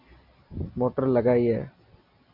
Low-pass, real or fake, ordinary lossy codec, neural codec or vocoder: 5.4 kHz; real; MP3, 48 kbps; none